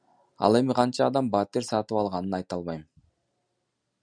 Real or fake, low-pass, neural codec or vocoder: real; 9.9 kHz; none